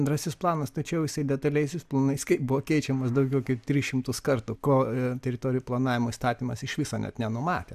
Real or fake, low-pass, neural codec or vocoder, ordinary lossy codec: real; 14.4 kHz; none; AAC, 96 kbps